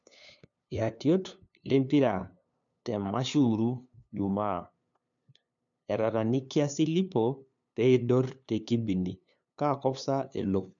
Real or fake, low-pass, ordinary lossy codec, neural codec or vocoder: fake; 7.2 kHz; MP3, 64 kbps; codec, 16 kHz, 2 kbps, FunCodec, trained on LibriTTS, 25 frames a second